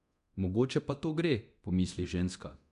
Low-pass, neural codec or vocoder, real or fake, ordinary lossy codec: 10.8 kHz; codec, 24 kHz, 0.9 kbps, DualCodec; fake; none